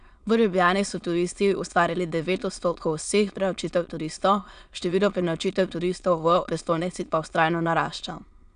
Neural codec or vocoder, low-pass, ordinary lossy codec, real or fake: autoencoder, 22.05 kHz, a latent of 192 numbers a frame, VITS, trained on many speakers; 9.9 kHz; none; fake